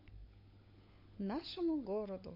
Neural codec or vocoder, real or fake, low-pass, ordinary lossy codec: codec, 16 kHz, 16 kbps, FunCodec, trained on LibriTTS, 50 frames a second; fake; 5.4 kHz; MP3, 32 kbps